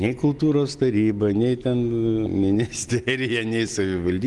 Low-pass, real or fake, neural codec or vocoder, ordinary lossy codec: 10.8 kHz; real; none; Opus, 24 kbps